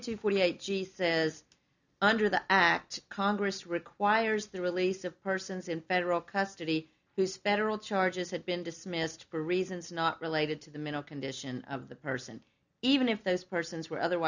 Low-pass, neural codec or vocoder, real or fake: 7.2 kHz; none; real